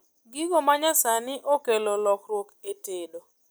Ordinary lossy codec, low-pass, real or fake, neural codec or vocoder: none; none; real; none